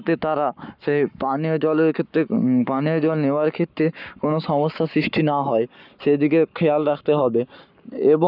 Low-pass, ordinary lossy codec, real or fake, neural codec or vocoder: 5.4 kHz; none; fake; codec, 44.1 kHz, 7.8 kbps, Pupu-Codec